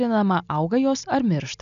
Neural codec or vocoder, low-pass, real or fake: none; 7.2 kHz; real